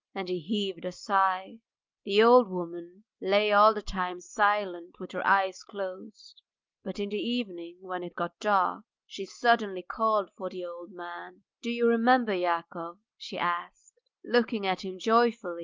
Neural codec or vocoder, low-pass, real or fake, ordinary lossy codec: none; 7.2 kHz; real; Opus, 32 kbps